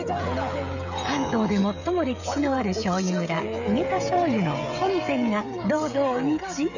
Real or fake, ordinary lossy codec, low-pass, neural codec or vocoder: fake; none; 7.2 kHz; codec, 16 kHz, 16 kbps, FreqCodec, smaller model